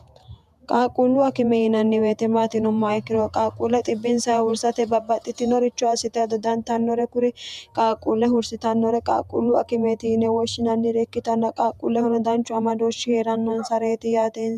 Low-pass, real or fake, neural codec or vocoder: 14.4 kHz; fake; vocoder, 48 kHz, 128 mel bands, Vocos